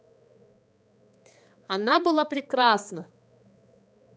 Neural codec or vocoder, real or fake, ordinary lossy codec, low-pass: codec, 16 kHz, 2 kbps, X-Codec, HuBERT features, trained on balanced general audio; fake; none; none